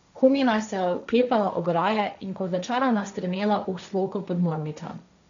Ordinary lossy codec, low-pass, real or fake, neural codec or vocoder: none; 7.2 kHz; fake; codec, 16 kHz, 1.1 kbps, Voila-Tokenizer